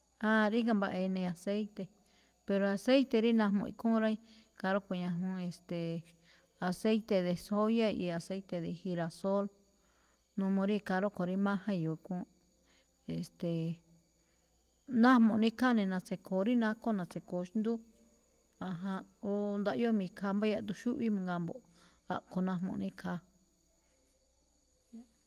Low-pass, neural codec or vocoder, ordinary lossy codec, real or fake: 14.4 kHz; none; Opus, 32 kbps; real